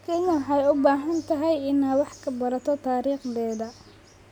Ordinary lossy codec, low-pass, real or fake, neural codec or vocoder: none; 19.8 kHz; real; none